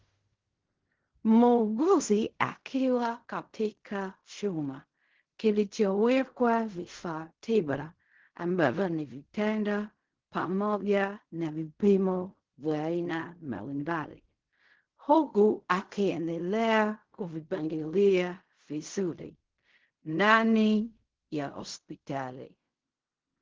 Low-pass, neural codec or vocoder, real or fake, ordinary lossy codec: 7.2 kHz; codec, 16 kHz in and 24 kHz out, 0.4 kbps, LongCat-Audio-Codec, fine tuned four codebook decoder; fake; Opus, 16 kbps